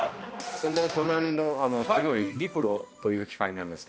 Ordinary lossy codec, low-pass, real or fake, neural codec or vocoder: none; none; fake; codec, 16 kHz, 1 kbps, X-Codec, HuBERT features, trained on balanced general audio